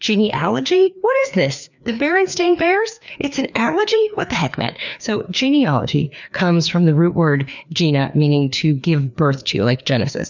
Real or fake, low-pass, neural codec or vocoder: fake; 7.2 kHz; codec, 16 kHz, 2 kbps, FreqCodec, larger model